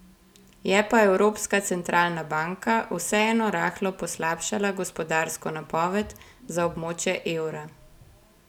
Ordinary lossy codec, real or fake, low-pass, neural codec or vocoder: none; real; 19.8 kHz; none